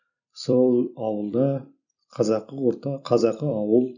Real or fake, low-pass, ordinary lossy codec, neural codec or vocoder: fake; 7.2 kHz; none; vocoder, 44.1 kHz, 128 mel bands every 256 samples, BigVGAN v2